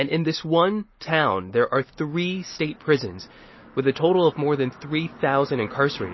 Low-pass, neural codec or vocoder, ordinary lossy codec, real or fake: 7.2 kHz; none; MP3, 24 kbps; real